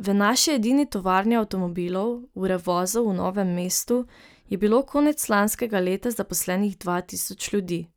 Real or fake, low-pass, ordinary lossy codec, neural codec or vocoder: real; none; none; none